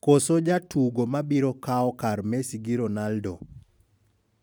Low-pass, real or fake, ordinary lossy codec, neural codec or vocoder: none; real; none; none